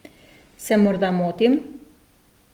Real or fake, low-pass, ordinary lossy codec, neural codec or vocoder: real; 19.8 kHz; Opus, 24 kbps; none